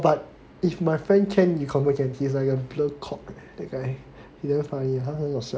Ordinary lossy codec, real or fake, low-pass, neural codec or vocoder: none; real; none; none